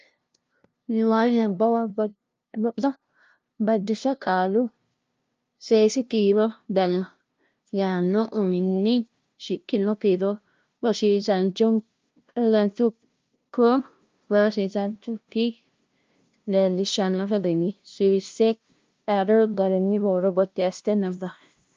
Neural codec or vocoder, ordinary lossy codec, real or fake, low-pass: codec, 16 kHz, 0.5 kbps, FunCodec, trained on LibriTTS, 25 frames a second; Opus, 24 kbps; fake; 7.2 kHz